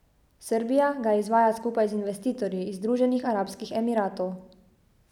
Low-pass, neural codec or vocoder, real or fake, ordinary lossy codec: 19.8 kHz; none; real; none